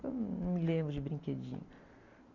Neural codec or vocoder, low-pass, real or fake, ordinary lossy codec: none; 7.2 kHz; real; Opus, 64 kbps